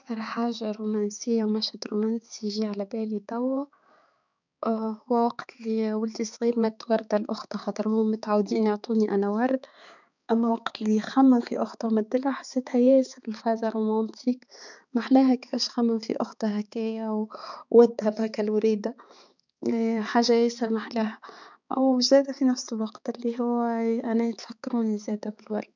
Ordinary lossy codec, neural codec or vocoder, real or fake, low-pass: none; codec, 16 kHz, 4 kbps, X-Codec, HuBERT features, trained on balanced general audio; fake; 7.2 kHz